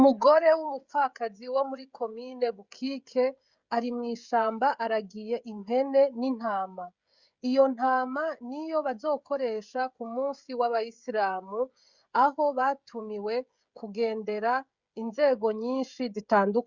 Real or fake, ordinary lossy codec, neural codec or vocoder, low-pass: fake; Opus, 64 kbps; codec, 16 kHz, 16 kbps, FreqCodec, smaller model; 7.2 kHz